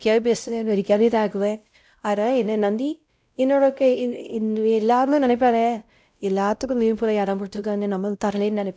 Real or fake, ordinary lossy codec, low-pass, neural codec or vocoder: fake; none; none; codec, 16 kHz, 0.5 kbps, X-Codec, WavLM features, trained on Multilingual LibriSpeech